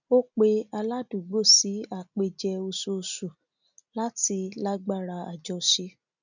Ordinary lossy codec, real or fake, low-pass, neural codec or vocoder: none; real; 7.2 kHz; none